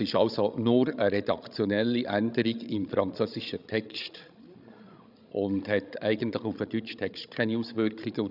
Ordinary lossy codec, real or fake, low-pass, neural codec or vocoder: none; fake; 5.4 kHz; codec, 16 kHz, 16 kbps, FreqCodec, larger model